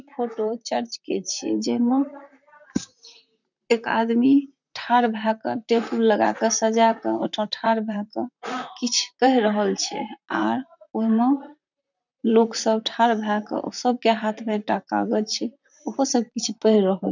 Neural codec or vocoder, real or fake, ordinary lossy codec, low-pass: vocoder, 44.1 kHz, 128 mel bands, Pupu-Vocoder; fake; none; 7.2 kHz